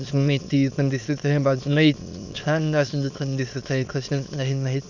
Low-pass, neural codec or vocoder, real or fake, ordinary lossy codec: 7.2 kHz; autoencoder, 22.05 kHz, a latent of 192 numbers a frame, VITS, trained on many speakers; fake; none